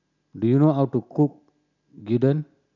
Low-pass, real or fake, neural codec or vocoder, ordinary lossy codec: 7.2 kHz; real; none; none